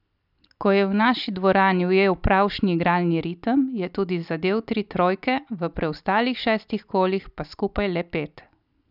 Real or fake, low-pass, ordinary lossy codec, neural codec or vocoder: real; 5.4 kHz; none; none